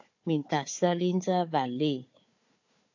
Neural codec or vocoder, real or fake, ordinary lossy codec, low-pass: codec, 16 kHz, 4 kbps, FunCodec, trained on Chinese and English, 50 frames a second; fake; AAC, 48 kbps; 7.2 kHz